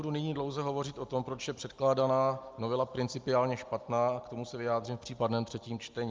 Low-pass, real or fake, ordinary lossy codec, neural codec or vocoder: 7.2 kHz; real; Opus, 32 kbps; none